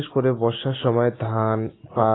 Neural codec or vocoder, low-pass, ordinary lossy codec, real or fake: none; 7.2 kHz; AAC, 16 kbps; real